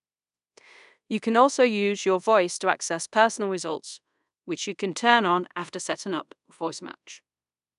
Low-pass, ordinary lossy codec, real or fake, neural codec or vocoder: 10.8 kHz; none; fake; codec, 24 kHz, 0.5 kbps, DualCodec